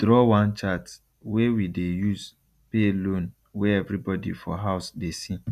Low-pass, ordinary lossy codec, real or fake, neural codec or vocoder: 14.4 kHz; none; real; none